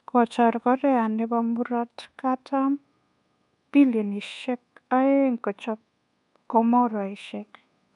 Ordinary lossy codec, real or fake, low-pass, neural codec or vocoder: none; fake; 10.8 kHz; codec, 24 kHz, 1.2 kbps, DualCodec